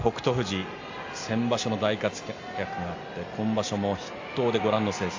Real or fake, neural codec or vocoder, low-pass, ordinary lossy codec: real; none; 7.2 kHz; AAC, 48 kbps